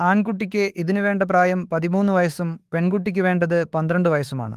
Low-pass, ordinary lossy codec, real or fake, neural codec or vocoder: 14.4 kHz; Opus, 24 kbps; fake; autoencoder, 48 kHz, 32 numbers a frame, DAC-VAE, trained on Japanese speech